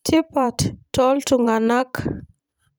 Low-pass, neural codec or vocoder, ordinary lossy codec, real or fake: none; vocoder, 44.1 kHz, 128 mel bands every 512 samples, BigVGAN v2; none; fake